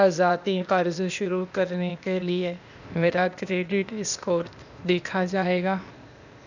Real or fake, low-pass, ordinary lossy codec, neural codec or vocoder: fake; 7.2 kHz; none; codec, 16 kHz, 0.8 kbps, ZipCodec